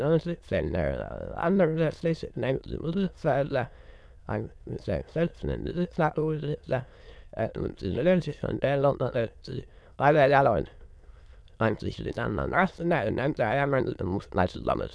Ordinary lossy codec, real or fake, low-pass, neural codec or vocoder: none; fake; none; autoencoder, 22.05 kHz, a latent of 192 numbers a frame, VITS, trained on many speakers